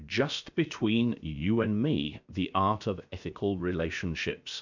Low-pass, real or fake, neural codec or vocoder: 7.2 kHz; fake; codec, 16 kHz, 0.7 kbps, FocalCodec